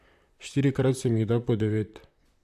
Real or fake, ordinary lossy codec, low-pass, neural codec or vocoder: fake; AAC, 96 kbps; 14.4 kHz; vocoder, 44.1 kHz, 128 mel bands, Pupu-Vocoder